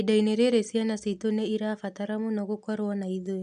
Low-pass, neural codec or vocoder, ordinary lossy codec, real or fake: 10.8 kHz; none; none; real